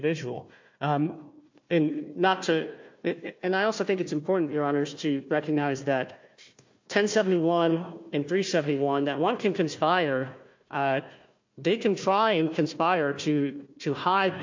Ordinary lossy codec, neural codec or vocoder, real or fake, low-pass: MP3, 48 kbps; codec, 16 kHz, 1 kbps, FunCodec, trained on Chinese and English, 50 frames a second; fake; 7.2 kHz